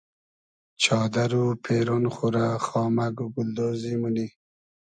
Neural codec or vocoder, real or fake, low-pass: none; real; 9.9 kHz